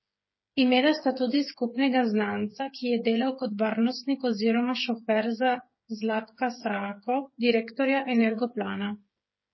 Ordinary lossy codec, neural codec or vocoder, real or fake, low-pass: MP3, 24 kbps; codec, 16 kHz, 8 kbps, FreqCodec, smaller model; fake; 7.2 kHz